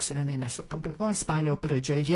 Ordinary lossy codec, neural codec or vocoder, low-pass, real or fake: AAC, 48 kbps; codec, 24 kHz, 0.9 kbps, WavTokenizer, medium music audio release; 10.8 kHz; fake